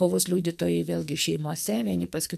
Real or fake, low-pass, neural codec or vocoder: fake; 14.4 kHz; codec, 44.1 kHz, 2.6 kbps, SNAC